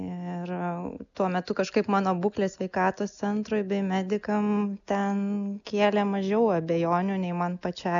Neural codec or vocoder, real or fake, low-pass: none; real; 7.2 kHz